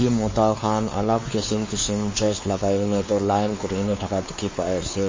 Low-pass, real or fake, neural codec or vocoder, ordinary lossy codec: 7.2 kHz; fake; codec, 16 kHz, 4 kbps, X-Codec, WavLM features, trained on Multilingual LibriSpeech; MP3, 32 kbps